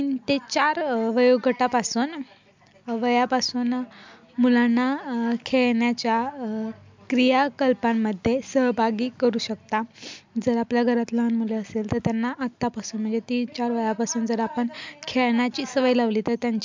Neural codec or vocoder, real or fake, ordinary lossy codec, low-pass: none; real; MP3, 64 kbps; 7.2 kHz